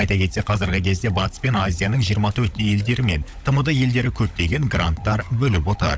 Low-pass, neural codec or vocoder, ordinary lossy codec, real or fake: none; codec, 16 kHz, 16 kbps, FunCodec, trained on LibriTTS, 50 frames a second; none; fake